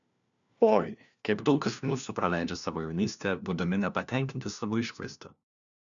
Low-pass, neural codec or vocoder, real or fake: 7.2 kHz; codec, 16 kHz, 1 kbps, FunCodec, trained on LibriTTS, 50 frames a second; fake